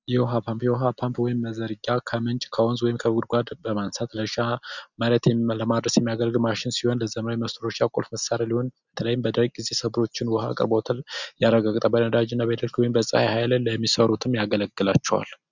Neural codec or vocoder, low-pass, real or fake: none; 7.2 kHz; real